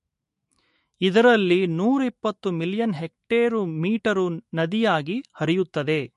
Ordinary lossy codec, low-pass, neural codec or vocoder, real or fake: MP3, 48 kbps; 14.4 kHz; autoencoder, 48 kHz, 128 numbers a frame, DAC-VAE, trained on Japanese speech; fake